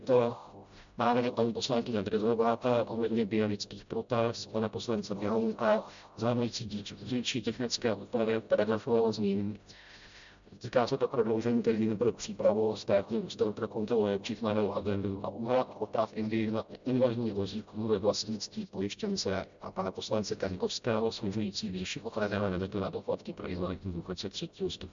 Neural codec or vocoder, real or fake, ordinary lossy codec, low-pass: codec, 16 kHz, 0.5 kbps, FreqCodec, smaller model; fake; MP3, 64 kbps; 7.2 kHz